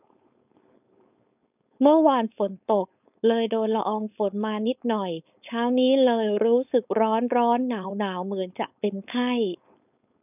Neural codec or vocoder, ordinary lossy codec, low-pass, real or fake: codec, 16 kHz, 4.8 kbps, FACodec; none; 3.6 kHz; fake